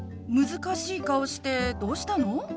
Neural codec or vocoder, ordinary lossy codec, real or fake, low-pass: none; none; real; none